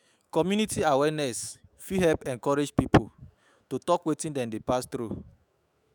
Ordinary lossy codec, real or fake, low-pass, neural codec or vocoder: none; fake; none; autoencoder, 48 kHz, 128 numbers a frame, DAC-VAE, trained on Japanese speech